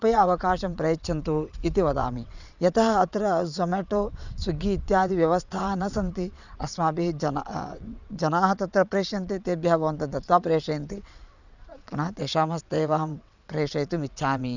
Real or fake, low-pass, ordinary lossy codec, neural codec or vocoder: fake; 7.2 kHz; none; vocoder, 22.05 kHz, 80 mel bands, WaveNeXt